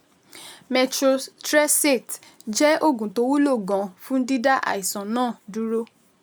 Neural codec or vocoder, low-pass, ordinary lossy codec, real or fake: none; none; none; real